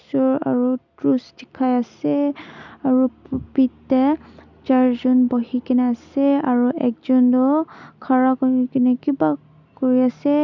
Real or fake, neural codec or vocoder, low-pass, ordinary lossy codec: real; none; 7.2 kHz; none